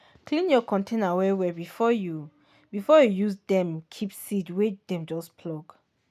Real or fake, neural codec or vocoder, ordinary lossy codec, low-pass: real; none; none; 14.4 kHz